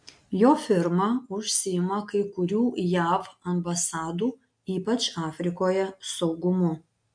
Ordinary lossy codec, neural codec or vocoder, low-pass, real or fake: MP3, 64 kbps; none; 9.9 kHz; real